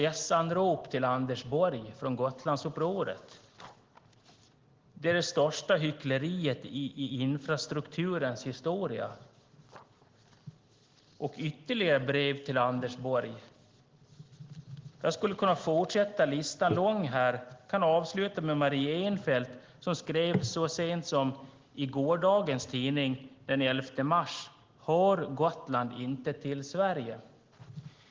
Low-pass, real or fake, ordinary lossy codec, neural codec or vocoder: 7.2 kHz; real; Opus, 16 kbps; none